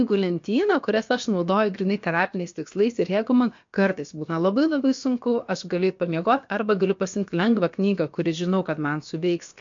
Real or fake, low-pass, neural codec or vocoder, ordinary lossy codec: fake; 7.2 kHz; codec, 16 kHz, about 1 kbps, DyCAST, with the encoder's durations; MP3, 48 kbps